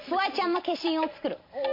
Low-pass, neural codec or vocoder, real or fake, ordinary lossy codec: 5.4 kHz; vocoder, 44.1 kHz, 128 mel bands every 512 samples, BigVGAN v2; fake; MP3, 32 kbps